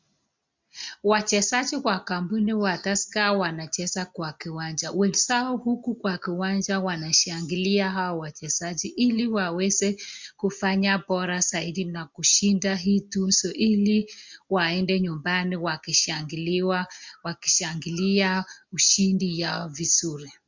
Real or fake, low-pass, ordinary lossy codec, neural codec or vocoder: real; 7.2 kHz; MP3, 64 kbps; none